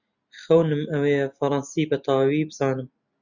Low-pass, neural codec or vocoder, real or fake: 7.2 kHz; none; real